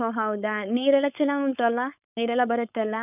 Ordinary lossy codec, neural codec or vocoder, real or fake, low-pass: none; codec, 16 kHz, 4.8 kbps, FACodec; fake; 3.6 kHz